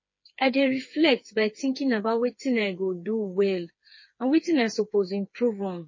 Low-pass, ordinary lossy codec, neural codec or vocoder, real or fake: 7.2 kHz; MP3, 32 kbps; codec, 16 kHz, 4 kbps, FreqCodec, smaller model; fake